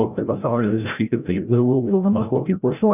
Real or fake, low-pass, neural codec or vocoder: fake; 3.6 kHz; codec, 16 kHz, 0.5 kbps, FreqCodec, larger model